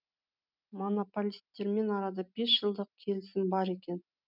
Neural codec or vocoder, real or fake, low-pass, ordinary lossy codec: none; real; 5.4 kHz; none